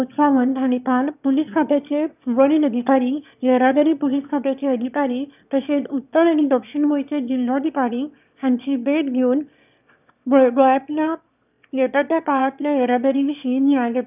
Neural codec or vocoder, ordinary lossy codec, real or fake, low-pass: autoencoder, 22.05 kHz, a latent of 192 numbers a frame, VITS, trained on one speaker; none; fake; 3.6 kHz